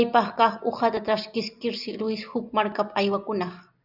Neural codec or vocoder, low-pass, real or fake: none; 5.4 kHz; real